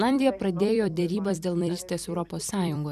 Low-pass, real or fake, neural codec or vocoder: 14.4 kHz; fake; vocoder, 44.1 kHz, 128 mel bands every 256 samples, BigVGAN v2